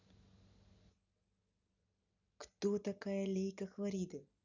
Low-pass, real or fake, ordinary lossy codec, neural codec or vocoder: 7.2 kHz; real; none; none